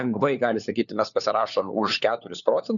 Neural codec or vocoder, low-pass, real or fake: codec, 16 kHz, 4 kbps, FunCodec, trained on LibriTTS, 50 frames a second; 7.2 kHz; fake